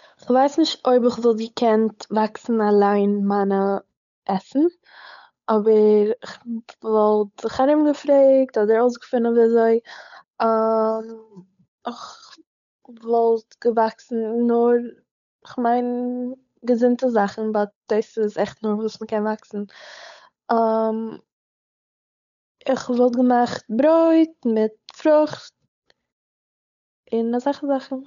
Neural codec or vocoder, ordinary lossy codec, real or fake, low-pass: codec, 16 kHz, 8 kbps, FunCodec, trained on Chinese and English, 25 frames a second; none; fake; 7.2 kHz